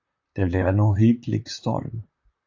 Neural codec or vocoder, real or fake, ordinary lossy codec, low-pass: vocoder, 44.1 kHz, 128 mel bands, Pupu-Vocoder; fake; AAC, 48 kbps; 7.2 kHz